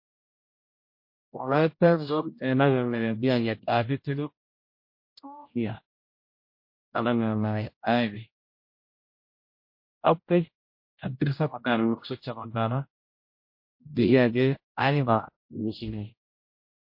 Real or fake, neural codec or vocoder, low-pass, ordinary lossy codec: fake; codec, 16 kHz, 0.5 kbps, X-Codec, HuBERT features, trained on general audio; 5.4 kHz; MP3, 32 kbps